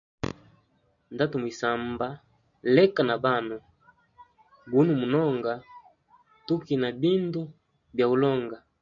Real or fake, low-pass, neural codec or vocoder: real; 7.2 kHz; none